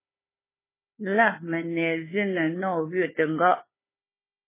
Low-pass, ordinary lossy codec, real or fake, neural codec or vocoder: 3.6 kHz; MP3, 16 kbps; fake; codec, 16 kHz, 4 kbps, FunCodec, trained on Chinese and English, 50 frames a second